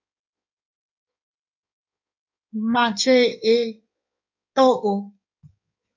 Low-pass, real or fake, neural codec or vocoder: 7.2 kHz; fake; codec, 16 kHz in and 24 kHz out, 1.1 kbps, FireRedTTS-2 codec